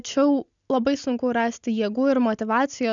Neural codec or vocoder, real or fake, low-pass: none; real; 7.2 kHz